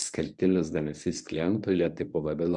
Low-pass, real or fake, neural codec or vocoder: 10.8 kHz; fake; codec, 24 kHz, 0.9 kbps, WavTokenizer, medium speech release version 1